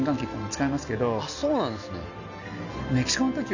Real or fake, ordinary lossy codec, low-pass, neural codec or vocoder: real; none; 7.2 kHz; none